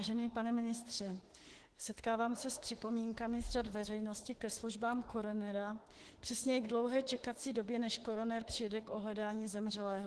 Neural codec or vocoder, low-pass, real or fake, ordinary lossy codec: autoencoder, 48 kHz, 32 numbers a frame, DAC-VAE, trained on Japanese speech; 10.8 kHz; fake; Opus, 16 kbps